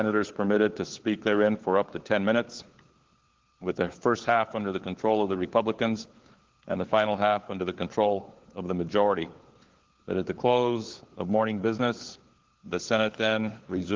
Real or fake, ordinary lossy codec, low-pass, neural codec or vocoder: fake; Opus, 16 kbps; 7.2 kHz; codec, 16 kHz, 4 kbps, FunCodec, trained on LibriTTS, 50 frames a second